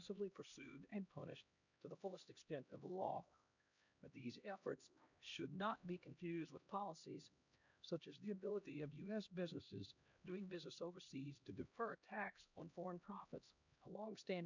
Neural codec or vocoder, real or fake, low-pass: codec, 16 kHz, 1 kbps, X-Codec, HuBERT features, trained on LibriSpeech; fake; 7.2 kHz